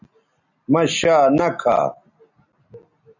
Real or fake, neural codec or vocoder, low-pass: real; none; 7.2 kHz